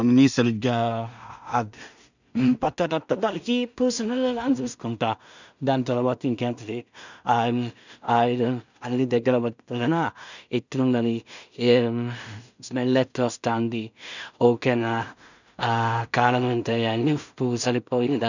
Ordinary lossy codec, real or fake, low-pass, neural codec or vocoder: none; fake; 7.2 kHz; codec, 16 kHz in and 24 kHz out, 0.4 kbps, LongCat-Audio-Codec, two codebook decoder